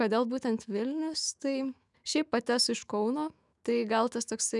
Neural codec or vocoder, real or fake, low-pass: autoencoder, 48 kHz, 128 numbers a frame, DAC-VAE, trained on Japanese speech; fake; 10.8 kHz